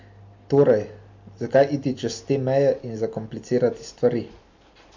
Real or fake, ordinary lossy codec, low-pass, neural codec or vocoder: real; MP3, 48 kbps; 7.2 kHz; none